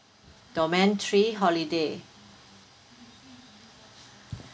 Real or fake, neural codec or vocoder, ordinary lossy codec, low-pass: real; none; none; none